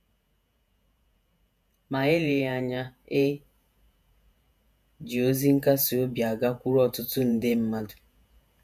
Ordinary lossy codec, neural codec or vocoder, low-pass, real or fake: none; vocoder, 48 kHz, 128 mel bands, Vocos; 14.4 kHz; fake